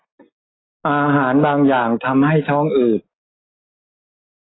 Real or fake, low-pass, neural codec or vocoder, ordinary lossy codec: real; 7.2 kHz; none; AAC, 16 kbps